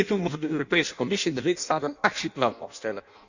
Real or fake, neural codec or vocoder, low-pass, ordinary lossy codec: fake; codec, 16 kHz in and 24 kHz out, 0.6 kbps, FireRedTTS-2 codec; 7.2 kHz; none